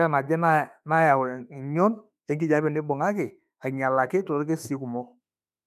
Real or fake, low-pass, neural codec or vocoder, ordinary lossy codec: fake; 14.4 kHz; autoencoder, 48 kHz, 32 numbers a frame, DAC-VAE, trained on Japanese speech; none